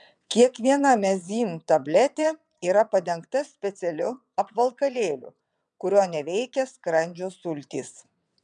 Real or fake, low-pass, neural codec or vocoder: fake; 9.9 kHz; vocoder, 22.05 kHz, 80 mel bands, WaveNeXt